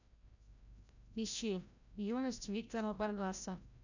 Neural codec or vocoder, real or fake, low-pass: codec, 16 kHz, 0.5 kbps, FreqCodec, larger model; fake; 7.2 kHz